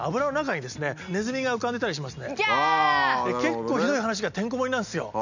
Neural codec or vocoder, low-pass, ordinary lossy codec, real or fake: none; 7.2 kHz; none; real